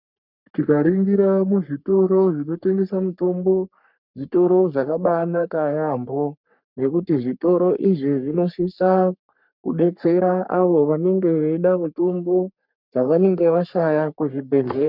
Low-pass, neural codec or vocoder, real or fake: 5.4 kHz; codec, 44.1 kHz, 3.4 kbps, Pupu-Codec; fake